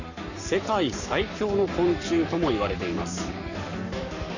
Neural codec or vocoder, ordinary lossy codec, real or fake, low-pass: codec, 44.1 kHz, 7.8 kbps, Pupu-Codec; none; fake; 7.2 kHz